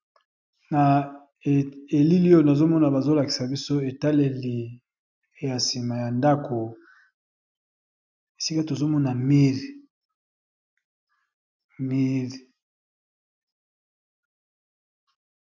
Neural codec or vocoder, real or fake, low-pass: none; real; 7.2 kHz